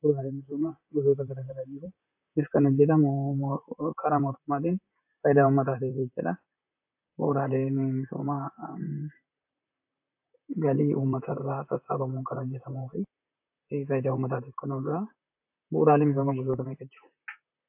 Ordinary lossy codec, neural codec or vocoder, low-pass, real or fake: AAC, 32 kbps; vocoder, 44.1 kHz, 128 mel bands, Pupu-Vocoder; 3.6 kHz; fake